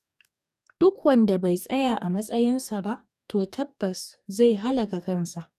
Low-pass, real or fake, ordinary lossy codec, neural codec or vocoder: 14.4 kHz; fake; none; codec, 44.1 kHz, 2.6 kbps, DAC